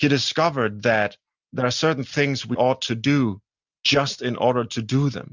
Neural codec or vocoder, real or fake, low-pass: none; real; 7.2 kHz